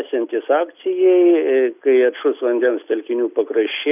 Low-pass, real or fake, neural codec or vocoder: 3.6 kHz; real; none